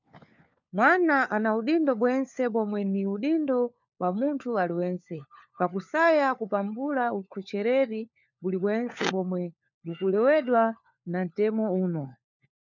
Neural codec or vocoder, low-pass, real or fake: codec, 16 kHz, 4 kbps, FunCodec, trained on LibriTTS, 50 frames a second; 7.2 kHz; fake